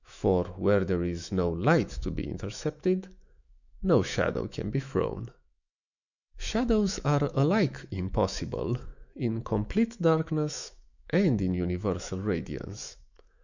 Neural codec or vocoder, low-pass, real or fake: autoencoder, 48 kHz, 128 numbers a frame, DAC-VAE, trained on Japanese speech; 7.2 kHz; fake